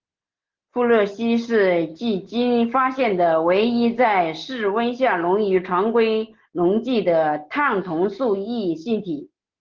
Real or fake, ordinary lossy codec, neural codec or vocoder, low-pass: real; Opus, 16 kbps; none; 7.2 kHz